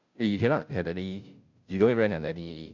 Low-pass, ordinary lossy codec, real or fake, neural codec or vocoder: 7.2 kHz; none; fake; codec, 16 kHz, 0.5 kbps, FunCodec, trained on Chinese and English, 25 frames a second